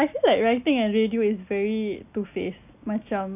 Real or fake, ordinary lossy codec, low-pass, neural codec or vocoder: real; none; 3.6 kHz; none